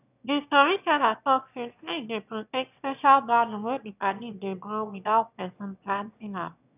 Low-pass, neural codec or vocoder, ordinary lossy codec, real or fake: 3.6 kHz; autoencoder, 22.05 kHz, a latent of 192 numbers a frame, VITS, trained on one speaker; none; fake